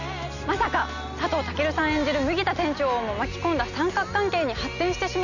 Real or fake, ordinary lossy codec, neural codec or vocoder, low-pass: real; none; none; 7.2 kHz